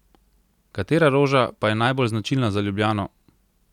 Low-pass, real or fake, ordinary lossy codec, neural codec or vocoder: 19.8 kHz; real; none; none